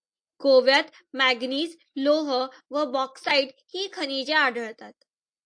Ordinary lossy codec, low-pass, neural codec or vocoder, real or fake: AAC, 64 kbps; 9.9 kHz; none; real